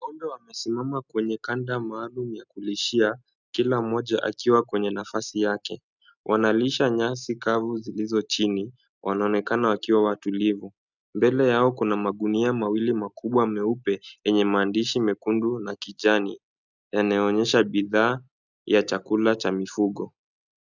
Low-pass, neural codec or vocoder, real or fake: 7.2 kHz; none; real